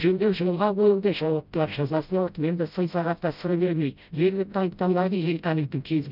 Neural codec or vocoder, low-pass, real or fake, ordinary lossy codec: codec, 16 kHz, 0.5 kbps, FreqCodec, smaller model; 5.4 kHz; fake; none